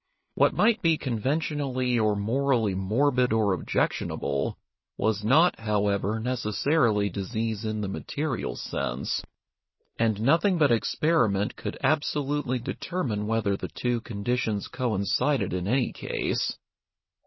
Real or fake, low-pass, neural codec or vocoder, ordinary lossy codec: real; 7.2 kHz; none; MP3, 24 kbps